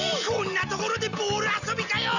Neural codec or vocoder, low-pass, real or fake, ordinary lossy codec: none; 7.2 kHz; real; none